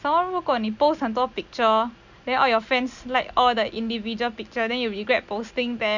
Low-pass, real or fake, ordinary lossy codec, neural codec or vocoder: 7.2 kHz; real; none; none